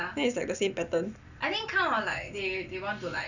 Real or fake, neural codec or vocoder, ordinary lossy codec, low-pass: real; none; none; 7.2 kHz